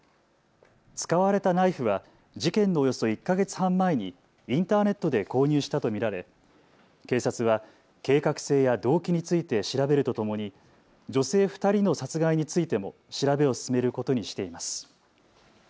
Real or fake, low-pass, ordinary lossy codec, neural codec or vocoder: real; none; none; none